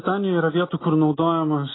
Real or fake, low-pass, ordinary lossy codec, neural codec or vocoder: real; 7.2 kHz; AAC, 16 kbps; none